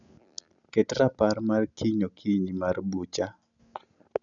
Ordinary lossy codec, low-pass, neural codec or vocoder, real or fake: none; 7.2 kHz; none; real